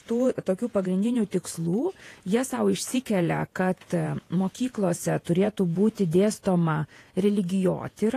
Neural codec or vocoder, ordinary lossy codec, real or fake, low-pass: vocoder, 48 kHz, 128 mel bands, Vocos; AAC, 48 kbps; fake; 14.4 kHz